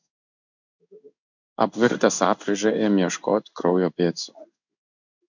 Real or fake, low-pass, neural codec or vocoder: fake; 7.2 kHz; codec, 16 kHz in and 24 kHz out, 1 kbps, XY-Tokenizer